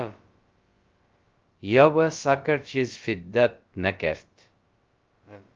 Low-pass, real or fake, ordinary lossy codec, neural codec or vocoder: 7.2 kHz; fake; Opus, 24 kbps; codec, 16 kHz, about 1 kbps, DyCAST, with the encoder's durations